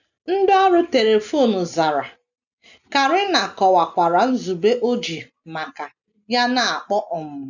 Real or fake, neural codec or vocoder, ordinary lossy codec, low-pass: real; none; none; 7.2 kHz